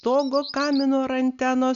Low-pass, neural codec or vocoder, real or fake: 7.2 kHz; none; real